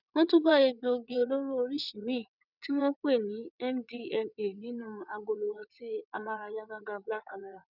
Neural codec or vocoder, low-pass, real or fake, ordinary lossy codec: vocoder, 22.05 kHz, 80 mel bands, WaveNeXt; 5.4 kHz; fake; none